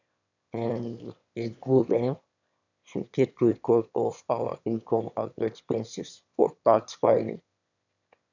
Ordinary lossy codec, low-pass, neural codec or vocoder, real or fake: none; 7.2 kHz; autoencoder, 22.05 kHz, a latent of 192 numbers a frame, VITS, trained on one speaker; fake